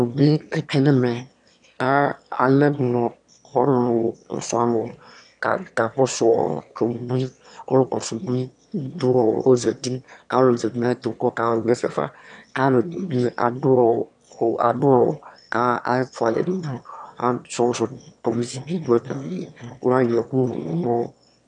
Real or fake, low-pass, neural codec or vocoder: fake; 9.9 kHz; autoencoder, 22.05 kHz, a latent of 192 numbers a frame, VITS, trained on one speaker